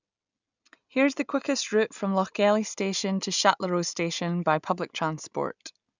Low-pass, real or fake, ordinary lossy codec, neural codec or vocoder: 7.2 kHz; real; none; none